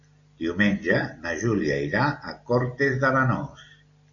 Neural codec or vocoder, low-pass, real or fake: none; 7.2 kHz; real